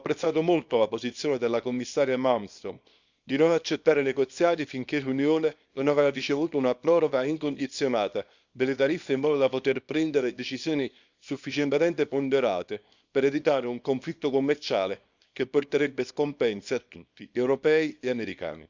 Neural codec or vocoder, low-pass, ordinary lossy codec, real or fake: codec, 24 kHz, 0.9 kbps, WavTokenizer, small release; 7.2 kHz; Opus, 64 kbps; fake